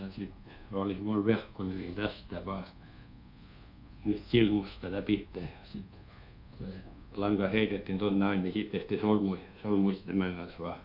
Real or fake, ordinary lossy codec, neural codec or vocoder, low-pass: fake; none; codec, 24 kHz, 1.2 kbps, DualCodec; 5.4 kHz